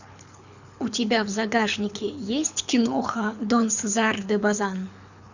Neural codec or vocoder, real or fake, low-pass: codec, 24 kHz, 6 kbps, HILCodec; fake; 7.2 kHz